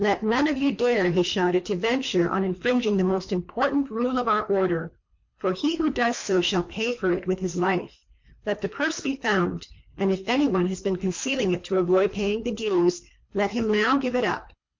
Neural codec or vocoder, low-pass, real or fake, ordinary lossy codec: codec, 24 kHz, 3 kbps, HILCodec; 7.2 kHz; fake; MP3, 48 kbps